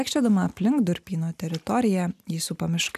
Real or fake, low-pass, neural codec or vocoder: real; 14.4 kHz; none